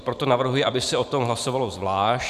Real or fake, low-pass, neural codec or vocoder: fake; 14.4 kHz; vocoder, 44.1 kHz, 128 mel bands every 512 samples, BigVGAN v2